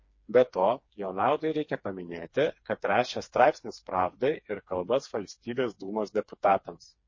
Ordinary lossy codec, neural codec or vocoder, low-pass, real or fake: MP3, 32 kbps; codec, 16 kHz, 4 kbps, FreqCodec, smaller model; 7.2 kHz; fake